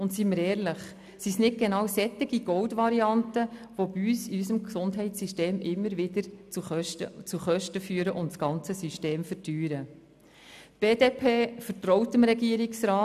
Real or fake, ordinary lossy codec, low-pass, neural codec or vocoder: real; none; 14.4 kHz; none